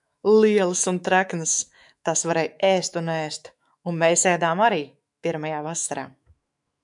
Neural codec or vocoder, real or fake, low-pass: autoencoder, 48 kHz, 128 numbers a frame, DAC-VAE, trained on Japanese speech; fake; 10.8 kHz